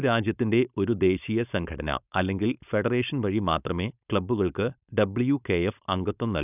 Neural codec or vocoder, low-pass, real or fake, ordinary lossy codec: codec, 16 kHz, 4.8 kbps, FACodec; 3.6 kHz; fake; none